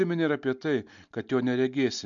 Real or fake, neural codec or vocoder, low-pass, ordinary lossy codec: real; none; 7.2 kHz; MP3, 64 kbps